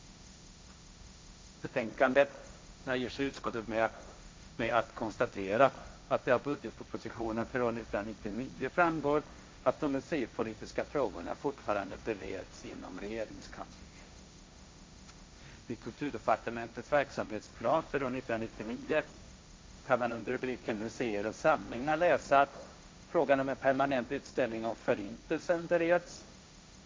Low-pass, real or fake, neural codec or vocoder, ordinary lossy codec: none; fake; codec, 16 kHz, 1.1 kbps, Voila-Tokenizer; none